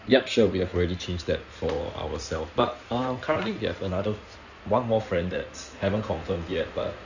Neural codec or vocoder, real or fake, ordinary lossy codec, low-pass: codec, 16 kHz in and 24 kHz out, 2.2 kbps, FireRedTTS-2 codec; fake; none; 7.2 kHz